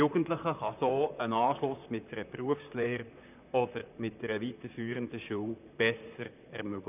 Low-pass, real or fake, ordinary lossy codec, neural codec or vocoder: 3.6 kHz; fake; none; vocoder, 44.1 kHz, 128 mel bands, Pupu-Vocoder